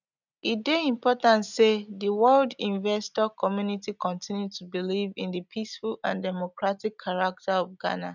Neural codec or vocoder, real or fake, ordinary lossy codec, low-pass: none; real; none; 7.2 kHz